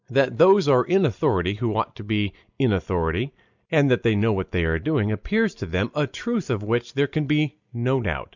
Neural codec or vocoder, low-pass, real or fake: none; 7.2 kHz; real